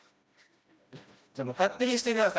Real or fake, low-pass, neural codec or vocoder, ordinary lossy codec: fake; none; codec, 16 kHz, 1 kbps, FreqCodec, smaller model; none